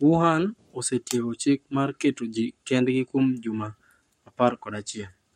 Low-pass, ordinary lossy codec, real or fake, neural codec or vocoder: 19.8 kHz; MP3, 64 kbps; fake; codec, 44.1 kHz, 7.8 kbps, Pupu-Codec